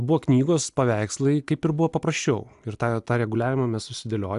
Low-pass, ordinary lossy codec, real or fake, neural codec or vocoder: 10.8 kHz; AAC, 96 kbps; real; none